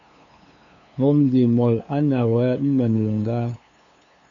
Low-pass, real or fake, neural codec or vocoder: 7.2 kHz; fake; codec, 16 kHz, 2 kbps, FunCodec, trained on LibriTTS, 25 frames a second